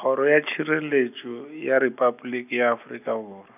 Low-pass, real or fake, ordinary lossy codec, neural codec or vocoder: 3.6 kHz; real; none; none